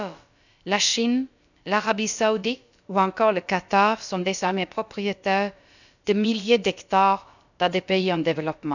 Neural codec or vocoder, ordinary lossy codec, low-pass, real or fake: codec, 16 kHz, about 1 kbps, DyCAST, with the encoder's durations; none; 7.2 kHz; fake